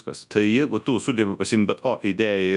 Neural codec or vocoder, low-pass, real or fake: codec, 24 kHz, 0.9 kbps, WavTokenizer, large speech release; 10.8 kHz; fake